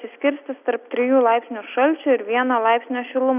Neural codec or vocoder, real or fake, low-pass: none; real; 3.6 kHz